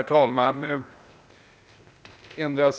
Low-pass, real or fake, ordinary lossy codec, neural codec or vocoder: none; fake; none; codec, 16 kHz, 0.8 kbps, ZipCodec